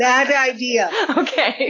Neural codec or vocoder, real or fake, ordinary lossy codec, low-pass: none; real; AAC, 32 kbps; 7.2 kHz